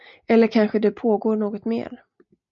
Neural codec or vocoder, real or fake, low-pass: none; real; 7.2 kHz